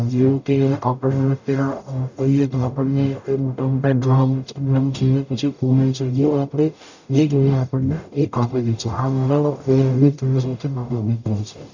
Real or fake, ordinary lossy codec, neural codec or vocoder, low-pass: fake; none; codec, 44.1 kHz, 0.9 kbps, DAC; 7.2 kHz